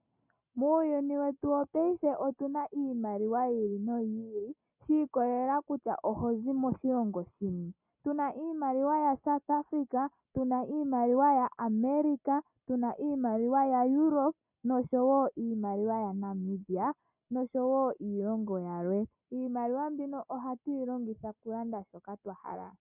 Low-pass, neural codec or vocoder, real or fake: 3.6 kHz; none; real